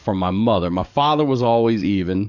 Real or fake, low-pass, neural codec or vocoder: fake; 7.2 kHz; codec, 44.1 kHz, 7.8 kbps, DAC